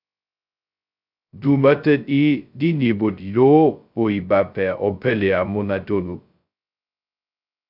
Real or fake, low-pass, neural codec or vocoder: fake; 5.4 kHz; codec, 16 kHz, 0.2 kbps, FocalCodec